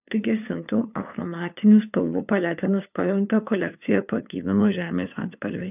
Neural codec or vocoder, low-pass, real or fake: codec, 16 kHz, 2 kbps, FunCodec, trained on LibriTTS, 25 frames a second; 3.6 kHz; fake